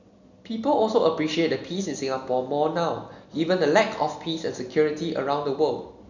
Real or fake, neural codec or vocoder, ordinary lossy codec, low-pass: real; none; none; 7.2 kHz